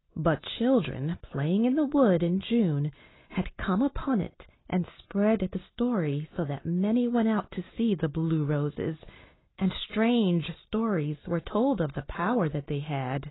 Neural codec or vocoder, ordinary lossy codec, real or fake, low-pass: none; AAC, 16 kbps; real; 7.2 kHz